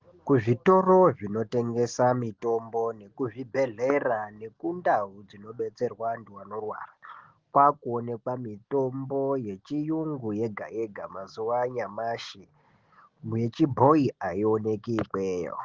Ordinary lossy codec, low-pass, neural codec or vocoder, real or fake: Opus, 16 kbps; 7.2 kHz; none; real